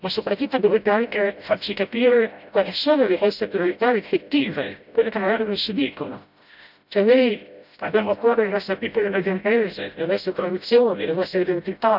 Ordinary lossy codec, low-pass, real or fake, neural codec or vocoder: none; 5.4 kHz; fake; codec, 16 kHz, 0.5 kbps, FreqCodec, smaller model